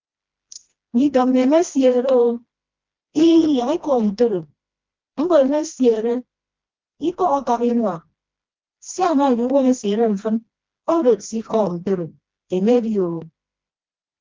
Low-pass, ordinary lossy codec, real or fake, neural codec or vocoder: 7.2 kHz; Opus, 32 kbps; fake; codec, 16 kHz, 1 kbps, FreqCodec, smaller model